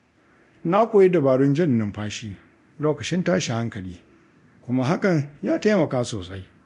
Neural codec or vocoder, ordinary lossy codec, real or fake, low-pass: codec, 24 kHz, 0.9 kbps, DualCodec; MP3, 64 kbps; fake; 10.8 kHz